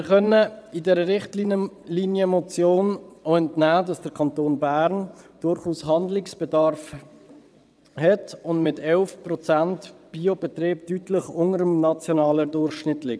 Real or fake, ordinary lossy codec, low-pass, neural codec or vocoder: fake; none; none; vocoder, 22.05 kHz, 80 mel bands, WaveNeXt